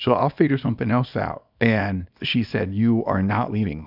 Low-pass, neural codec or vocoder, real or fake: 5.4 kHz; codec, 24 kHz, 0.9 kbps, WavTokenizer, small release; fake